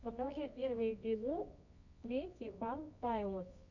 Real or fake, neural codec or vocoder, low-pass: fake; codec, 24 kHz, 0.9 kbps, WavTokenizer, medium music audio release; 7.2 kHz